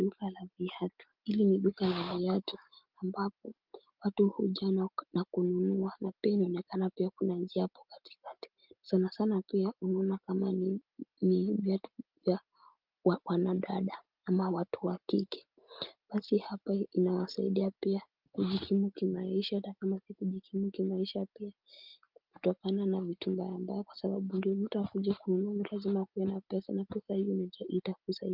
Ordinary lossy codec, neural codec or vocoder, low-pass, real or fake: Opus, 32 kbps; none; 5.4 kHz; real